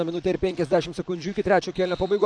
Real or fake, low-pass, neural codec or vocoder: fake; 9.9 kHz; vocoder, 44.1 kHz, 128 mel bands, Pupu-Vocoder